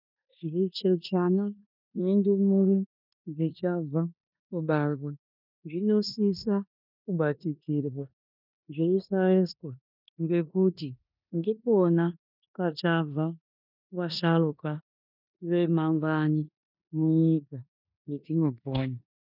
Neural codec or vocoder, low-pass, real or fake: codec, 16 kHz in and 24 kHz out, 0.9 kbps, LongCat-Audio-Codec, four codebook decoder; 5.4 kHz; fake